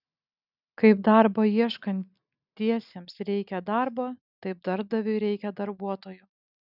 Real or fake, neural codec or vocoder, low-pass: real; none; 5.4 kHz